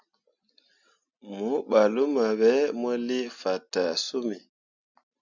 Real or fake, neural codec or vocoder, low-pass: real; none; 7.2 kHz